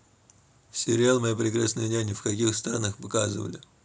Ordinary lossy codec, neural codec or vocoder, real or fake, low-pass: none; none; real; none